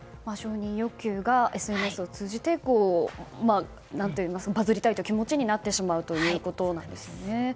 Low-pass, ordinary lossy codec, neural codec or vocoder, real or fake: none; none; none; real